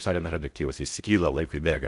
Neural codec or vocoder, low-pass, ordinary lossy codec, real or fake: codec, 16 kHz in and 24 kHz out, 0.6 kbps, FocalCodec, streaming, 4096 codes; 10.8 kHz; AAC, 96 kbps; fake